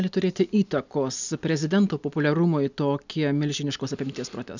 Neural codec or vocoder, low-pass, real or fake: none; 7.2 kHz; real